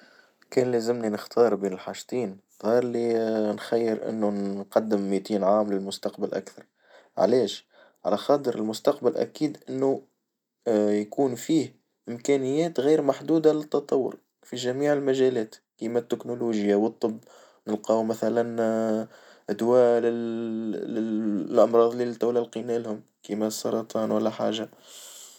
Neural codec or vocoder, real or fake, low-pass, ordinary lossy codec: none; real; 19.8 kHz; none